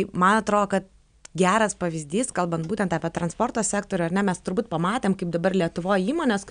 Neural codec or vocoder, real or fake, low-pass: none; real; 9.9 kHz